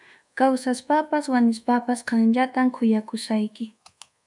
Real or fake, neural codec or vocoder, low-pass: fake; codec, 24 kHz, 1.2 kbps, DualCodec; 10.8 kHz